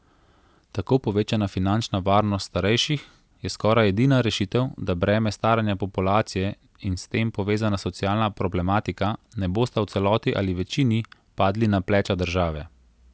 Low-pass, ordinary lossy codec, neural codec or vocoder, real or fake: none; none; none; real